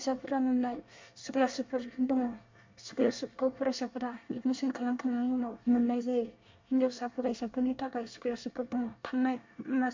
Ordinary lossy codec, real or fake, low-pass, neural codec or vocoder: MP3, 48 kbps; fake; 7.2 kHz; codec, 24 kHz, 1 kbps, SNAC